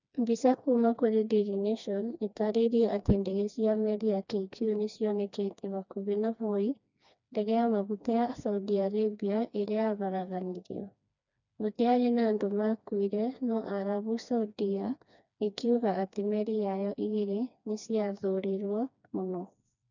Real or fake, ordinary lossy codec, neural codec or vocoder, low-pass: fake; none; codec, 16 kHz, 2 kbps, FreqCodec, smaller model; 7.2 kHz